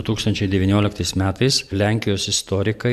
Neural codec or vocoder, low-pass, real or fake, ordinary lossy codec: vocoder, 44.1 kHz, 128 mel bands every 512 samples, BigVGAN v2; 14.4 kHz; fake; AAC, 96 kbps